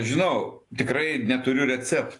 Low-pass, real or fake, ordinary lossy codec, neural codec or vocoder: 10.8 kHz; real; AAC, 48 kbps; none